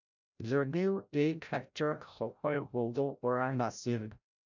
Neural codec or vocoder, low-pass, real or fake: codec, 16 kHz, 0.5 kbps, FreqCodec, larger model; 7.2 kHz; fake